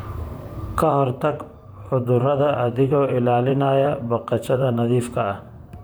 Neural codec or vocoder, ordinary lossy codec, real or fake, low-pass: vocoder, 44.1 kHz, 128 mel bands, Pupu-Vocoder; none; fake; none